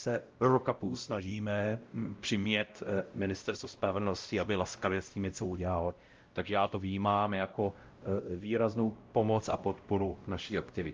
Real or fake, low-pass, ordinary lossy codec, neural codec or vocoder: fake; 7.2 kHz; Opus, 24 kbps; codec, 16 kHz, 0.5 kbps, X-Codec, WavLM features, trained on Multilingual LibriSpeech